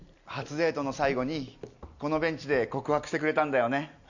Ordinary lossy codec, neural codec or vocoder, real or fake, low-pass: none; none; real; 7.2 kHz